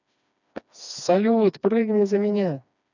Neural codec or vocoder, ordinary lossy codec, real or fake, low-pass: codec, 16 kHz, 2 kbps, FreqCodec, smaller model; none; fake; 7.2 kHz